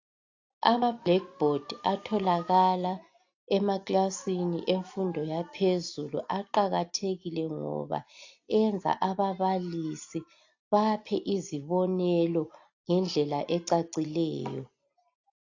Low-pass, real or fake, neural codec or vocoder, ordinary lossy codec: 7.2 kHz; real; none; AAC, 48 kbps